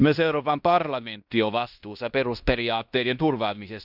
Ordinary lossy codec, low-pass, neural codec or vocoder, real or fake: none; 5.4 kHz; codec, 16 kHz in and 24 kHz out, 0.9 kbps, LongCat-Audio-Codec, fine tuned four codebook decoder; fake